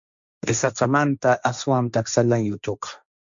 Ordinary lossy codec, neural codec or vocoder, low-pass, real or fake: MP3, 64 kbps; codec, 16 kHz, 1.1 kbps, Voila-Tokenizer; 7.2 kHz; fake